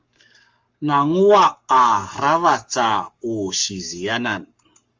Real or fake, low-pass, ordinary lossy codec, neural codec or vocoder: fake; 7.2 kHz; Opus, 32 kbps; codec, 44.1 kHz, 7.8 kbps, Pupu-Codec